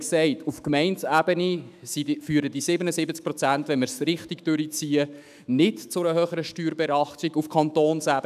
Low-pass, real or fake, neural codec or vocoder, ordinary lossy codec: 14.4 kHz; fake; autoencoder, 48 kHz, 128 numbers a frame, DAC-VAE, trained on Japanese speech; none